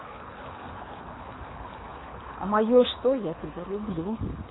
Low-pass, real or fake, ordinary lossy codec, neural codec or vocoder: 7.2 kHz; fake; AAC, 16 kbps; codec, 24 kHz, 6 kbps, HILCodec